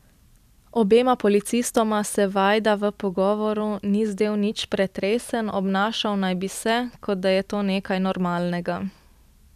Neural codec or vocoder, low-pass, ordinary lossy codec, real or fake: none; 14.4 kHz; none; real